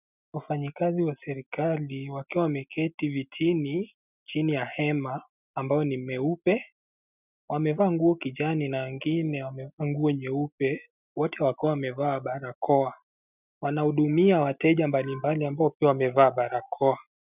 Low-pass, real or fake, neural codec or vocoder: 3.6 kHz; real; none